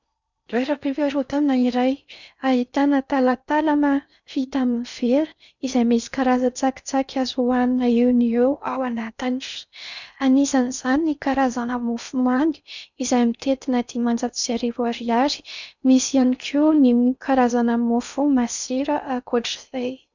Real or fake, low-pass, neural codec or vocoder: fake; 7.2 kHz; codec, 16 kHz in and 24 kHz out, 0.6 kbps, FocalCodec, streaming, 4096 codes